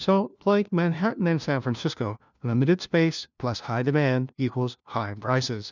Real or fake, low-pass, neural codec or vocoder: fake; 7.2 kHz; codec, 16 kHz, 1 kbps, FunCodec, trained on LibriTTS, 50 frames a second